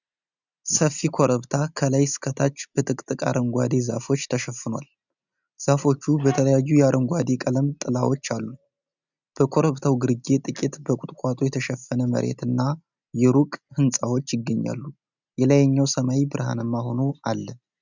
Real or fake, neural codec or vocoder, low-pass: real; none; 7.2 kHz